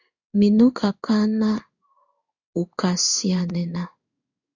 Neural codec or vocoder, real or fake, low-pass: codec, 16 kHz in and 24 kHz out, 1 kbps, XY-Tokenizer; fake; 7.2 kHz